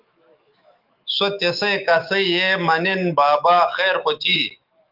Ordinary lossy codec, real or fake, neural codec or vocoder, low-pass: Opus, 32 kbps; fake; autoencoder, 48 kHz, 128 numbers a frame, DAC-VAE, trained on Japanese speech; 5.4 kHz